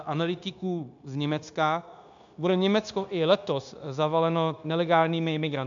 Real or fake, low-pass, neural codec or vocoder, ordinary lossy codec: fake; 7.2 kHz; codec, 16 kHz, 0.9 kbps, LongCat-Audio-Codec; AAC, 64 kbps